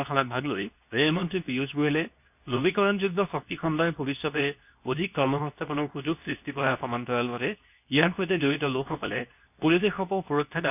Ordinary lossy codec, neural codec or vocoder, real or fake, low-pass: none; codec, 24 kHz, 0.9 kbps, WavTokenizer, medium speech release version 2; fake; 3.6 kHz